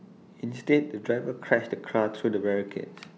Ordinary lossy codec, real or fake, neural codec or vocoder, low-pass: none; real; none; none